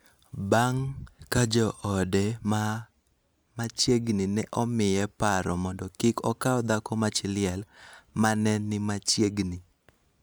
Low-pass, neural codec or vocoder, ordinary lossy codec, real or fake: none; none; none; real